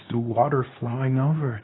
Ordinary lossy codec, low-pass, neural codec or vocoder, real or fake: AAC, 16 kbps; 7.2 kHz; codec, 24 kHz, 0.9 kbps, WavTokenizer, medium speech release version 2; fake